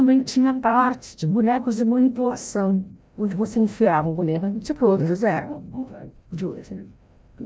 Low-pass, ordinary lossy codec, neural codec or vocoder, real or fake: none; none; codec, 16 kHz, 0.5 kbps, FreqCodec, larger model; fake